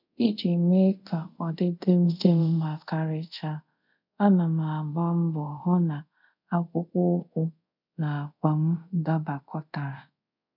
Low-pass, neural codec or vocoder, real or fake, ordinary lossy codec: 5.4 kHz; codec, 24 kHz, 0.5 kbps, DualCodec; fake; none